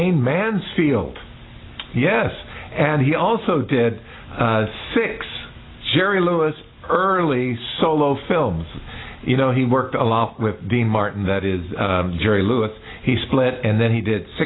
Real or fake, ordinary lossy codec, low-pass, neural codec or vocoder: real; AAC, 16 kbps; 7.2 kHz; none